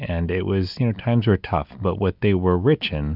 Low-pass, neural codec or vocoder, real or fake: 5.4 kHz; none; real